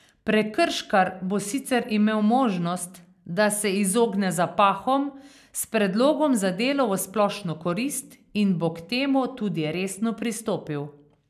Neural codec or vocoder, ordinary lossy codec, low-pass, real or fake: none; none; 14.4 kHz; real